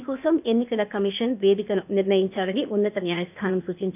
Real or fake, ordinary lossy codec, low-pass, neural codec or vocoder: fake; none; 3.6 kHz; codec, 16 kHz, 0.8 kbps, ZipCodec